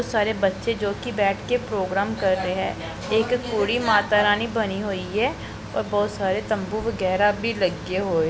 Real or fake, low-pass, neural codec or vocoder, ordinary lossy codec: real; none; none; none